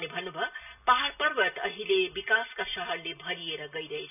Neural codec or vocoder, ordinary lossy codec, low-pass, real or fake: none; none; 3.6 kHz; real